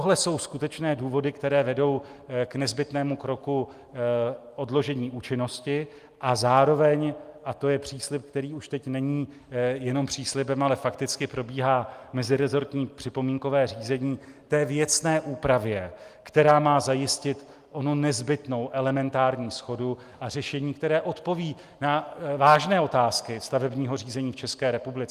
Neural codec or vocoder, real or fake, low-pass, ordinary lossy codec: none; real; 14.4 kHz; Opus, 32 kbps